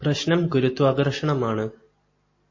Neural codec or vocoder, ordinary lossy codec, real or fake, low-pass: none; MP3, 32 kbps; real; 7.2 kHz